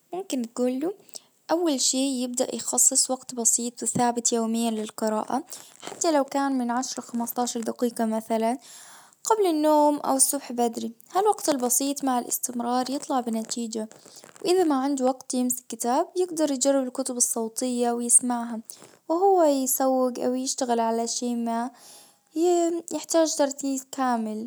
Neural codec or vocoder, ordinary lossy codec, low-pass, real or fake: none; none; none; real